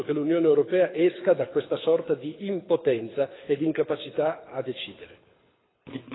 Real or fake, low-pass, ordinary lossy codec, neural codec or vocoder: fake; 7.2 kHz; AAC, 16 kbps; codec, 24 kHz, 6 kbps, HILCodec